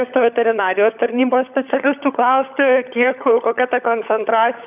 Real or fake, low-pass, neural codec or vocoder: fake; 3.6 kHz; codec, 16 kHz, 16 kbps, FunCodec, trained on LibriTTS, 50 frames a second